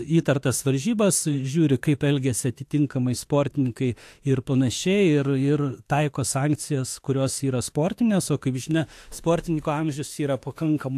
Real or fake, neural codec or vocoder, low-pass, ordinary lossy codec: fake; autoencoder, 48 kHz, 32 numbers a frame, DAC-VAE, trained on Japanese speech; 14.4 kHz; AAC, 64 kbps